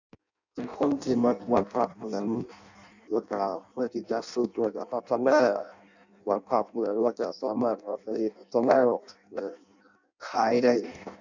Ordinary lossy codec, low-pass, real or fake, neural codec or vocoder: none; 7.2 kHz; fake; codec, 16 kHz in and 24 kHz out, 0.6 kbps, FireRedTTS-2 codec